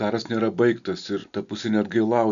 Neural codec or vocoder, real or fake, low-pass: none; real; 7.2 kHz